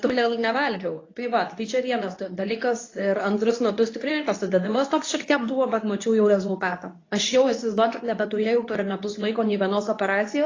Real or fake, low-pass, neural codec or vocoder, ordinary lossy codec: fake; 7.2 kHz; codec, 24 kHz, 0.9 kbps, WavTokenizer, medium speech release version 2; AAC, 32 kbps